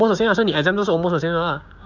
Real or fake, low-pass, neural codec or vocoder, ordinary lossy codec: fake; 7.2 kHz; codec, 16 kHz, 6 kbps, DAC; none